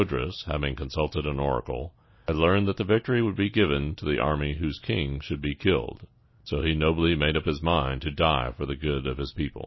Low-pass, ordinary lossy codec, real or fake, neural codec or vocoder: 7.2 kHz; MP3, 24 kbps; real; none